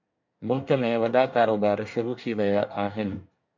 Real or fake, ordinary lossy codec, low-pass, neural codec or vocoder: fake; MP3, 64 kbps; 7.2 kHz; codec, 24 kHz, 1 kbps, SNAC